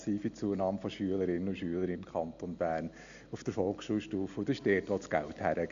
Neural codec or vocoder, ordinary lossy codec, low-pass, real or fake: none; AAC, 64 kbps; 7.2 kHz; real